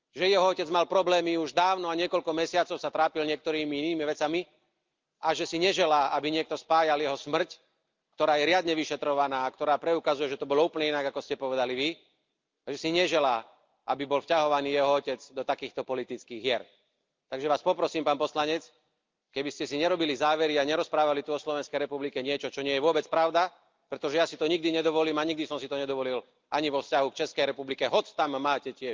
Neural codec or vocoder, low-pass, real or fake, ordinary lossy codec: none; 7.2 kHz; real; Opus, 24 kbps